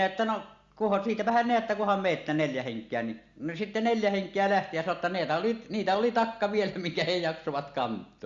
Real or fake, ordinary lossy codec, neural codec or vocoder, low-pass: real; Opus, 64 kbps; none; 7.2 kHz